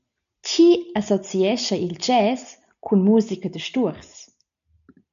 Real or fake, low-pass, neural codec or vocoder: real; 7.2 kHz; none